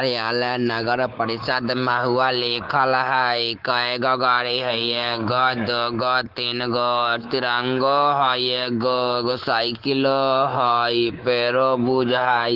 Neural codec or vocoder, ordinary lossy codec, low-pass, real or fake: codec, 24 kHz, 3.1 kbps, DualCodec; Opus, 16 kbps; 5.4 kHz; fake